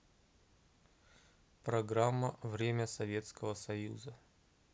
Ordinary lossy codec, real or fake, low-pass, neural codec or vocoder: none; real; none; none